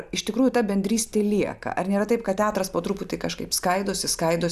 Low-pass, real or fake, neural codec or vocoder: 14.4 kHz; real; none